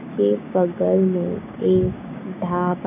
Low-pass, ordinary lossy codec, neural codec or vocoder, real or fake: 3.6 kHz; none; codec, 16 kHz, 6 kbps, DAC; fake